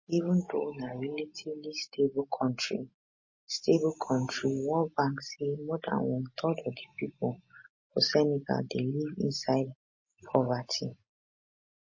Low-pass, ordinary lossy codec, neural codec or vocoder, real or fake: 7.2 kHz; MP3, 32 kbps; none; real